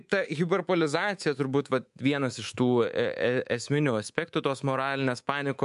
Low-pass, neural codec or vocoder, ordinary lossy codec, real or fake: 10.8 kHz; codec, 24 kHz, 3.1 kbps, DualCodec; MP3, 64 kbps; fake